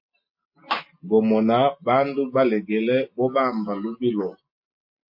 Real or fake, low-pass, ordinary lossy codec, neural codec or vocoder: real; 5.4 kHz; MP3, 32 kbps; none